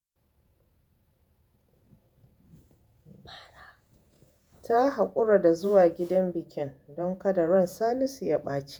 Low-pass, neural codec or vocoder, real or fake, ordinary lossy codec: none; vocoder, 48 kHz, 128 mel bands, Vocos; fake; none